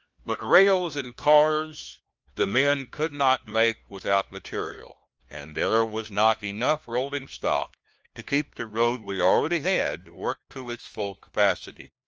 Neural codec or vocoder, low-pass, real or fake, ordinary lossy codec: codec, 16 kHz, 1 kbps, FunCodec, trained on LibriTTS, 50 frames a second; 7.2 kHz; fake; Opus, 24 kbps